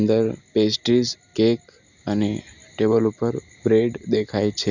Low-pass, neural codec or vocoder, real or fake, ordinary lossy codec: 7.2 kHz; none; real; none